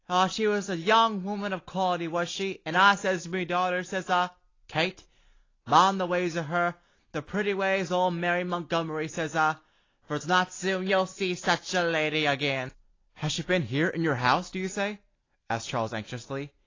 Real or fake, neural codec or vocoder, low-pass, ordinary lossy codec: real; none; 7.2 kHz; AAC, 32 kbps